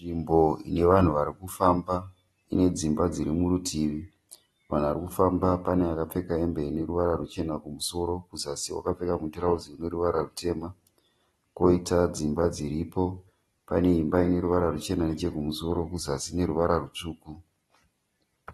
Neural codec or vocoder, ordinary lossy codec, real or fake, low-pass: none; AAC, 32 kbps; real; 19.8 kHz